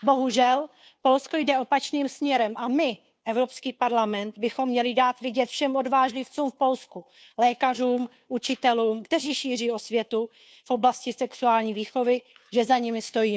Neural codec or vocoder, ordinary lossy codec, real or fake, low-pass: codec, 16 kHz, 2 kbps, FunCodec, trained on Chinese and English, 25 frames a second; none; fake; none